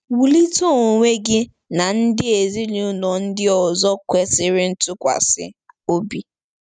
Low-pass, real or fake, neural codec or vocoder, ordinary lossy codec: 9.9 kHz; real; none; none